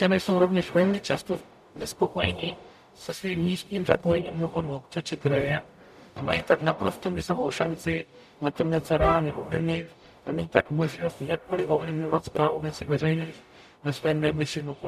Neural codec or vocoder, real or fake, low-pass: codec, 44.1 kHz, 0.9 kbps, DAC; fake; 14.4 kHz